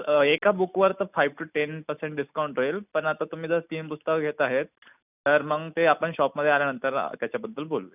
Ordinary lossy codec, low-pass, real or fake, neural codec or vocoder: none; 3.6 kHz; real; none